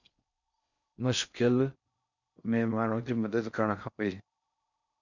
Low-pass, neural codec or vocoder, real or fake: 7.2 kHz; codec, 16 kHz in and 24 kHz out, 0.6 kbps, FocalCodec, streaming, 4096 codes; fake